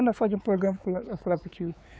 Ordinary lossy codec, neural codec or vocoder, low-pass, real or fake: none; codec, 16 kHz, 4 kbps, X-Codec, WavLM features, trained on Multilingual LibriSpeech; none; fake